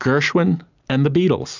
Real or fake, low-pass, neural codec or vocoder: real; 7.2 kHz; none